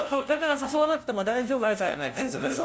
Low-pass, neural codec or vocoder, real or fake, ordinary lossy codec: none; codec, 16 kHz, 0.5 kbps, FunCodec, trained on LibriTTS, 25 frames a second; fake; none